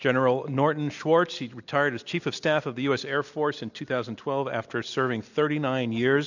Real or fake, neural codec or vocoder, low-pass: real; none; 7.2 kHz